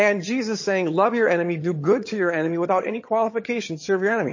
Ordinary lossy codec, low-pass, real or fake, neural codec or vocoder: MP3, 32 kbps; 7.2 kHz; fake; vocoder, 22.05 kHz, 80 mel bands, HiFi-GAN